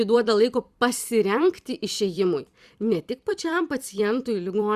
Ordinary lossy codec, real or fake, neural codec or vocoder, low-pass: Opus, 64 kbps; fake; vocoder, 44.1 kHz, 128 mel bands every 256 samples, BigVGAN v2; 14.4 kHz